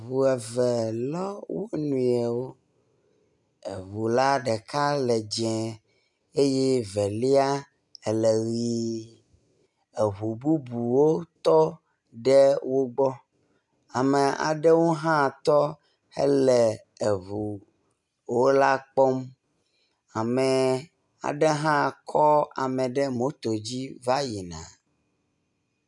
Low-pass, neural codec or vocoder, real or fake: 10.8 kHz; none; real